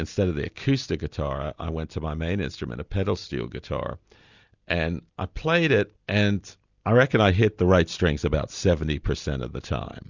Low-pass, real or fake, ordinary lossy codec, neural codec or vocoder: 7.2 kHz; real; Opus, 64 kbps; none